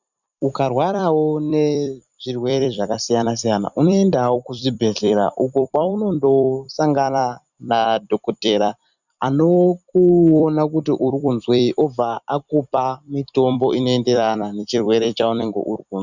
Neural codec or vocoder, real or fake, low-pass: vocoder, 44.1 kHz, 128 mel bands every 256 samples, BigVGAN v2; fake; 7.2 kHz